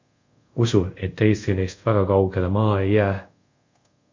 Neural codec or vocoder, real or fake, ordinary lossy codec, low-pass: codec, 24 kHz, 0.5 kbps, DualCodec; fake; MP3, 48 kbps; 7.2 kHz